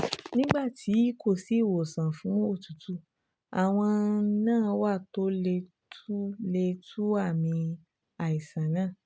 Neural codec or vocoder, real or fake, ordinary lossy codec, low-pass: none; real; none; none